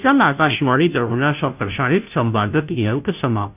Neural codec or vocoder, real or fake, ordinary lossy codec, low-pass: codec, 16 kHz, 0.5 kbps, FunCodec, trained on Chinese and English, 25 frames a second; fake; none; 3.6 kHz